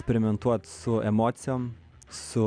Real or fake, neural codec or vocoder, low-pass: real; none; 9.9 kHz